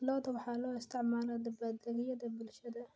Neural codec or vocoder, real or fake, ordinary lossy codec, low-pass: none; real; none; none